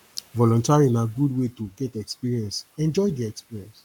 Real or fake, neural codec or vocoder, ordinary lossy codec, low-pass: fake; codec, 44.1 kHz, 7.8 kbps, Pupu-Codec; none; 19.8 kHz